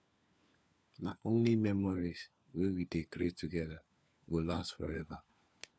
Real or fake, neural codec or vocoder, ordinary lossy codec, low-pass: fake; codec, 16 kHz, 4 kbps, FunCodec, trained on LibriTTS, 50 frames a second; none; none